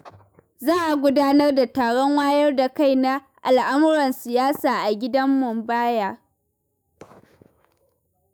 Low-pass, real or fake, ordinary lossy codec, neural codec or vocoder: none; fake; none; autoencoder, 48 kHz, 128 numbers a frame, DAC-VAE, trained on Japanese speech